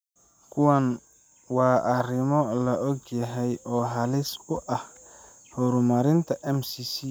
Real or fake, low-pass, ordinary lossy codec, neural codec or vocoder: real; none; none; none